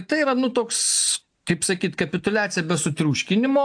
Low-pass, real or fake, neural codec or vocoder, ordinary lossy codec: 9.9 kHz; real; none; AAC, 64 kbps